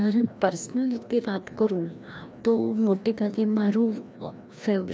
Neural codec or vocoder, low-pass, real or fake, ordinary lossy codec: codec, 16 kHz, 1 kbps, FreqCodec, larger model; none; fake; none